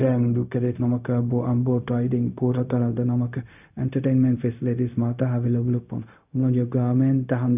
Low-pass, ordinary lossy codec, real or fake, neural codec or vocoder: 3.6 kHz; none; fake; codec, 16 kHz, 0.4 kbps, LongCat-Audio-Codec